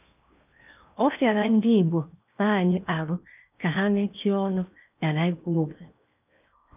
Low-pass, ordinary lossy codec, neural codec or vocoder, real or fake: 3.6 kHz; none; codec, 16 kHz in and 24 kHz out, 0.8 kbps, FocalCodec, streaming, 65536 codes; fake